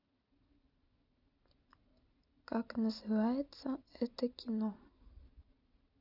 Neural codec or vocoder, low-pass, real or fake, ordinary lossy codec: none; 5.4 kHz; real; none